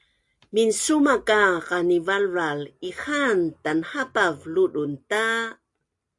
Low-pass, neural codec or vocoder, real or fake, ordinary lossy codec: 10.8 kHz; none; real; AAC, 64 kbps